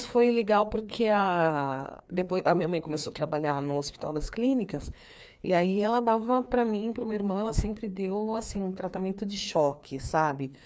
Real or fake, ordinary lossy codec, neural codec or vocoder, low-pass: fake; none; codec, 16 kHz, 2 kbps, FreqCodec, larger model; none